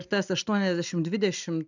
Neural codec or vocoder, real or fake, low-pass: none; real; 7.2 kHz